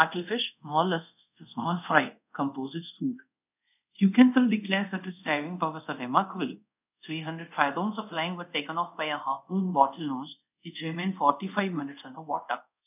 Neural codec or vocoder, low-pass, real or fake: codec, 24 kHz, 0.5 kbps, DualCodec; 3.6 kHz; fake